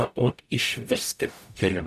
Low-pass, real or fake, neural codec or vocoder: 14.4 kHz; fake; codec, 44.1 kHz, 0.9 kbps, DAC